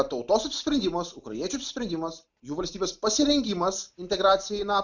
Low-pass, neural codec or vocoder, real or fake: 7.2 kHz; none; real